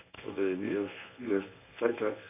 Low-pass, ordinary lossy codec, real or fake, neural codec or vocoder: 3.6 kHz; none; fake; codec, 24 kHz, 0.9 kbps, WavTokenizer, medium speech release version 1